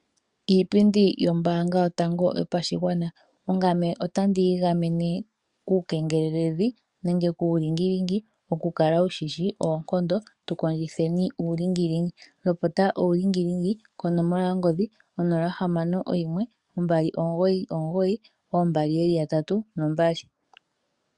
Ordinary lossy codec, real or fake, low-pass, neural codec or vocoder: Opus, 64 kbps; fake; 10.8 kHz; codec, 44.1 kHz, 7.8 kbps, DAC